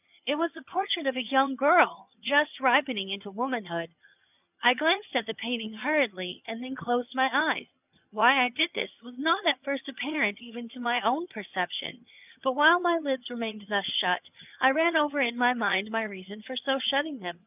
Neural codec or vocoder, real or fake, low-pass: vocoder, 22.05 kHz, 80 mel bands, HiFi-GAN; fake; 3.6 kHz